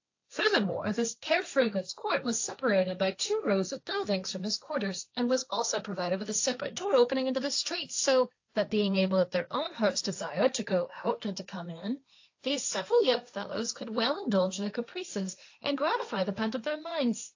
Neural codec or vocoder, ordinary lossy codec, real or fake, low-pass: codec, 16 kHz, 1.1 kbps, Voila-Tokenizer; AAC, 48 kbps; fake; 7.2 kHz